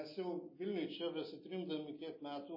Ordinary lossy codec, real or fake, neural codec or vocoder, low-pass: MP3, 24 kbps; real; none; 5.4 kHz